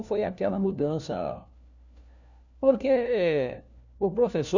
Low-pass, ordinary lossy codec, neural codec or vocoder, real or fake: 7.2 kHz; none; codec, 16 kHz, 1 kbps, FunCodec, trained on LibriTTS, 50 frames a second; fake